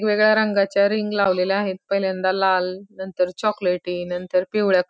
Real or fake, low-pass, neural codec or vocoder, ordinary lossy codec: real; none; none; none